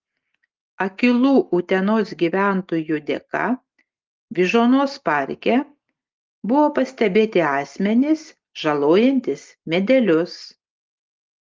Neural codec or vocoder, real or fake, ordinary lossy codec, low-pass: none; real; Opus, 24 kbps; 7.2 kHz